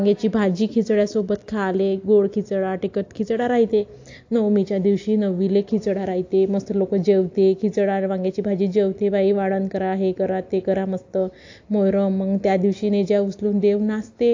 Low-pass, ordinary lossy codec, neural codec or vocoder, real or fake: 7.2 kHz; AAC, 48 kbps; none; real